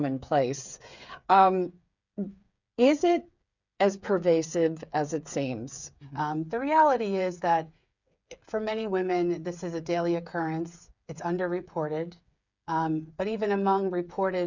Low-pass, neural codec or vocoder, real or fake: 7.2 kHz; codec, 16 kHz, 8 kbps, FreqCodec, smaller model; fake